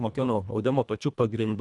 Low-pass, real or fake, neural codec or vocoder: 10.8 kHz; fake; codec, 24 kHz, 1.5 kbps, HILCodec